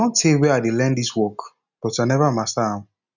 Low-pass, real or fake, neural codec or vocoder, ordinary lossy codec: 7.2 kHz; real; none; none